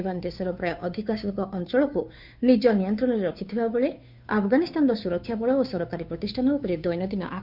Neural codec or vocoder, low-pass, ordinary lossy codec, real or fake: codec, 16 kHz, 2 kbps, FunCodec, trained on Chinese and English, 25 frames a second; 5.4 kHz; none; fake